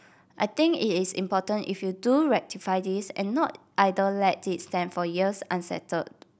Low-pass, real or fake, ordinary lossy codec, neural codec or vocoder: none; real; none; none